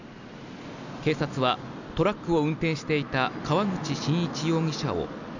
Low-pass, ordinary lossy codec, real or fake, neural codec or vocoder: 7.2 kHz; none; real; none